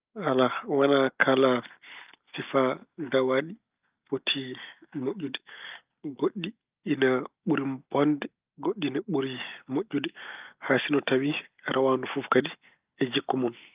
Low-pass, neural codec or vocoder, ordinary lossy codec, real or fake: 3.6 kHz; none; Opus, 24 kbps; real